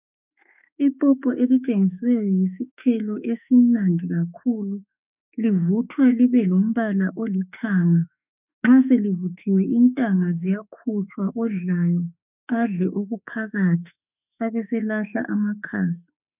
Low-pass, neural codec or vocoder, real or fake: 3.6 kHz; autoencoder, 48 kHz, 32 numbers a frame, DAC-VAE, trained on Japanese speech; fake